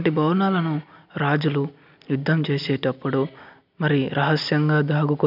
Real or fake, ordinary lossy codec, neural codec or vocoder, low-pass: real; none; none; 5.4 kHz